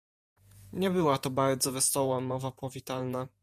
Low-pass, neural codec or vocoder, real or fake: 14.4 kHz; vocoder, 48 kHz, 128 mel bands, Vocos; fake